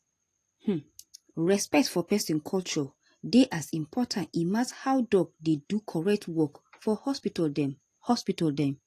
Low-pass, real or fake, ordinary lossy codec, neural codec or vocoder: 14.4 kHz; real; AAC, 48 kbps; none